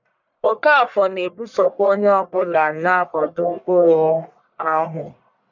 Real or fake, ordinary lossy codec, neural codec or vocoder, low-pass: fake; none; codec, 44.1 kHz, 1.7 kbps, Pupu-Codec; 7.2 kHz